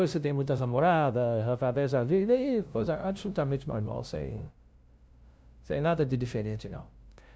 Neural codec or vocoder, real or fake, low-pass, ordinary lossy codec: codec, 16 kHz, 0.5 kbps, FunCodec, trained on LibriTTS, 25 frames a second; fake; none; none